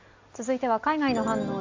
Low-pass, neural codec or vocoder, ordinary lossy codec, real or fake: 7.2 kHz; none; AAC, 48 kbps; real